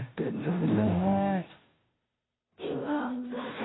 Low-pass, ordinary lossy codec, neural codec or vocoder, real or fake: 7.2 kHz; AAC, 16 kbps; codec, 16 kHz, 0.5 kbps, FunCodec, trained on Chinese and English, 25 frames a second; fake